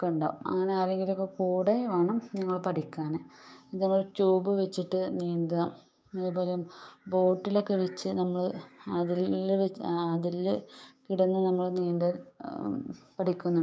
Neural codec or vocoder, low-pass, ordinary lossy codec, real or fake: codec, 16 kHz, 6 kbps, DAC; none; none; fake